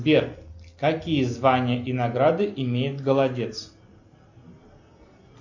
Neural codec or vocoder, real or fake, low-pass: none; real; 7.2 kHz